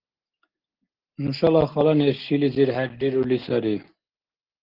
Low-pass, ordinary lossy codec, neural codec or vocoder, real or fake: 5.4 kHz; Opus, 16 kbps; none; real